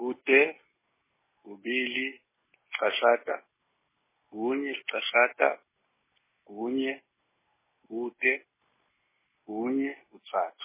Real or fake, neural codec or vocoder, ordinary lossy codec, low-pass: real; none; MP3, 16 kbps; 3.6 kHz